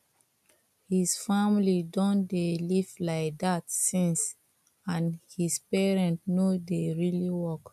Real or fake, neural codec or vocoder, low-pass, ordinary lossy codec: real; none; 14.4 kHz; none